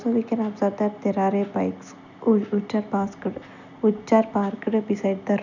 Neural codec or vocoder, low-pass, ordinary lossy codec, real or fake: none; 7.2 kHz; none; real